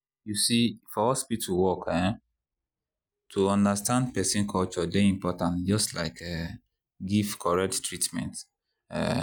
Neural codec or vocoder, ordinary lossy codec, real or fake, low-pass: none; none; real; none